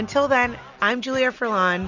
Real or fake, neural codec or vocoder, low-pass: real; none; 7.2 kHz